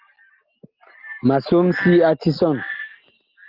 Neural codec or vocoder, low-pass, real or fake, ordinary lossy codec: none; 5.4 kHz; real; Opus, 32 kbps